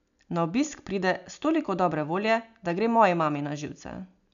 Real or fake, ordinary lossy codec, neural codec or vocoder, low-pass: real; none; none; 7.2 kHz